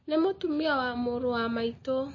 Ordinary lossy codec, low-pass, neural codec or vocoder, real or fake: MP3, 32 kbps; 7.2 kHz; none; real